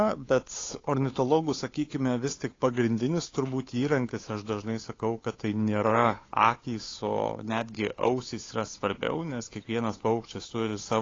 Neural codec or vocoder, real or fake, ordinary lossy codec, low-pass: codec, 16 kHz, 8 kbps, FunCodec, trained on Chinese and English, 25 frames a second; fake; AAC, 32 kbps; 7.2 kHz